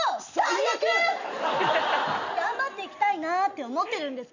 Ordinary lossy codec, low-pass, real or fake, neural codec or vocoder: none; 7.2 kHz; real; none